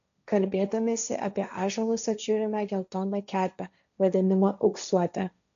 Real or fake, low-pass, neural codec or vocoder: fake; 7.2 kHz; codec, 16 kHz, 1.1 kbps, Voila-Tokenizer